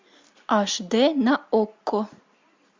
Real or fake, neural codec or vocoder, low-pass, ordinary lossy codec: real; none; 7.2 kHz; MP3, 64 kbps